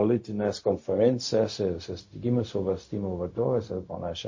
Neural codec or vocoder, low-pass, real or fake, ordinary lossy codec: codec, 16 kHz, 0.4 kbps, LongCat-Audio-Codec; 7.2 kHz; fake; MP3, 32 kbps